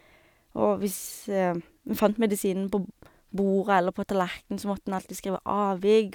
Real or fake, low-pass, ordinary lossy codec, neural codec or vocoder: real; none; none; none